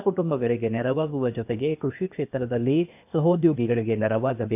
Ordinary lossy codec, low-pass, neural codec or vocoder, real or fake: none; 3.6 kHz; codec, 16 kHz, 0.8 kbps, ZipCodec; fake